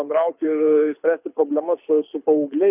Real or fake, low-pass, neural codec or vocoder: fake; 3.6 kHz; codec, 24 kHz, 6 kbps, HILCodec